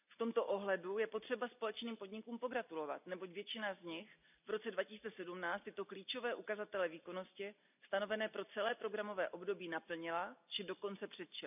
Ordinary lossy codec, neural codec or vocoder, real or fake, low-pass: none; none; real; 3.6 kHz